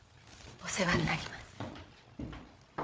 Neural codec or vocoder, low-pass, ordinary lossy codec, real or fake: codec, 16 kHz, 8 kbps, FreqCodec, larger model; none; none; fake